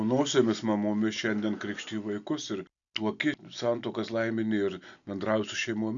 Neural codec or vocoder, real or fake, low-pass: none; real; 7.2 kHz